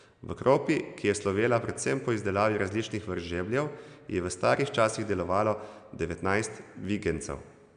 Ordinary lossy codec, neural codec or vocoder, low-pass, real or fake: none; none; 9.9 kHz; real